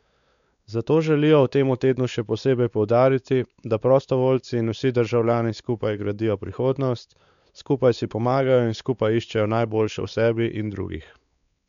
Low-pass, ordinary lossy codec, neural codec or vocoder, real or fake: 7.2 kHz; none; codec, 16 kHz, 4 kbps, X-Codec, WavLM features, trained on Multilingual LibriSpeech; fake